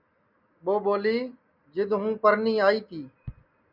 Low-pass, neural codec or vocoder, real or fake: 5.4 kHz; none; real